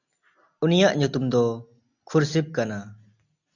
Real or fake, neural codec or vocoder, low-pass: real; none; 7.2 kHz